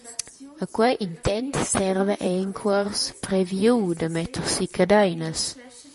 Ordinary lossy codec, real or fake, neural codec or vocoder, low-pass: MP3, 48 kbps; fake; vocoder, 44.1 kHz, 128 mel bands, Pupu-Vocoder; 14.4 kHz